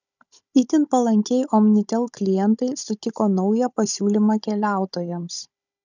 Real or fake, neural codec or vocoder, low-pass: fake; codec, 16 kHz, 16 kbps, FunCodec, trained on Chinese and English, 50 frames a second; 7.2 kHz